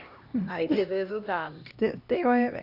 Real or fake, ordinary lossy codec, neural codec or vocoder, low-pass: fake; none; codec, 16 kHz, 1 kbps, X-Codec, HuBERT features, trained on LibriSpeech; 5.4 kHz